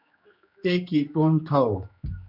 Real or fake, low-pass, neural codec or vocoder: fake; 5.4 kHz; codec, 16 kHz, 2 kbps, X-Codec, HuBERT features, trained on general audio